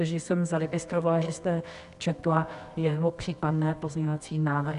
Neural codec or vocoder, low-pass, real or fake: codec, 24 kHz, 0.9 kbps, WavTokenizer, medium music audio release; 10.8 kHz; fake